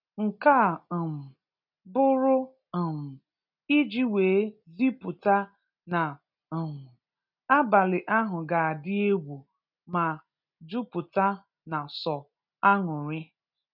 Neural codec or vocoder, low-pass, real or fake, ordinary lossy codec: none; 5.4 kHz; real; none